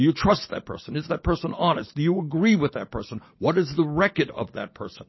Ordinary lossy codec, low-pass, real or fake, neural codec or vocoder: MP3, 24 kbps; 7.2 kHz; real; none